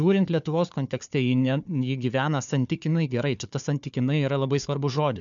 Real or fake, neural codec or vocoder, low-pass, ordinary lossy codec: fake; codec, 16 kHz, 4 kbps, FunCodec, trained on Chinese and English, 50 frames a second; 7.2 kHz; MP3, 96 kbps